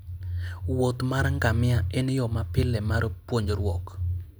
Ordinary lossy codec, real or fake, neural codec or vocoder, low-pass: none; real; none; none